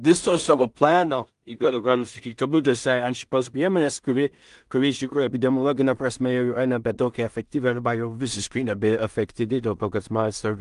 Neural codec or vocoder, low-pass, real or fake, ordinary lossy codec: codec, 16 kHz in and 24 kHz out, 0.4 kbps, LongCat-Audio-Codec, two codebook decoder; 10.8 kHz; fake; Opus, 32 kbps